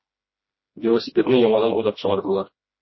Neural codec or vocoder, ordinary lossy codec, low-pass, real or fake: codec, 16 kHz, 1 kbps, FreqCodec, smaller model; MP3, 24 kbps; 7.2 kHz; fake